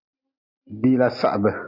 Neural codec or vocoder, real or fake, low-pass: none; real; 5.4 kHz